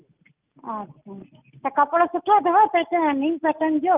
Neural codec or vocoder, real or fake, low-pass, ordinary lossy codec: none; real; 3.6 kHz; Opus, 24 kbps